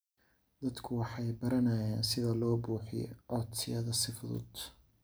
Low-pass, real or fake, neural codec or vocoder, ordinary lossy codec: none; real; none; none